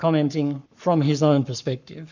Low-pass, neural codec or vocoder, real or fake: 7.2 kHz; codec, 44.1 kHz, 7.8 kbps, Pupu-Codec; fake